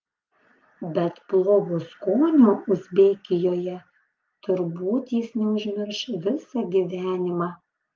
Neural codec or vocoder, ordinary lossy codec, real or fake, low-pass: none; Opus, 32 kbps; real; 7.2 kHz